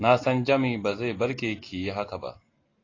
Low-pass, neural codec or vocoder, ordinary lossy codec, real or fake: 7.2 kHz; none; AAC, 32 kbps; real